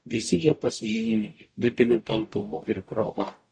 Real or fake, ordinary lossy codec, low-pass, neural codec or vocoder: fake; AAC, 48 kbps; 9.9 kHz; codec, 44.1 kHz, 0.9 kbps, DAC